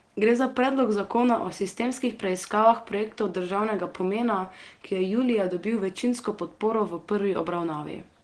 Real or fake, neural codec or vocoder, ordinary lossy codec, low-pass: real; none; Opus, 16 kbps; 9.9 kHz